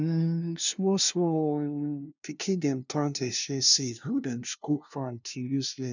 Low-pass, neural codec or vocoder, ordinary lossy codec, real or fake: 7.2 kHz; codec, 16 kHz, 0.5 kbps, FunCodec, trained on LibriTTS, 25 frames a second; none; fake